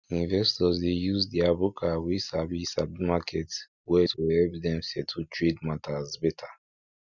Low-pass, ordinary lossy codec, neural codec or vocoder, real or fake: 7.2 kHz; none; none; real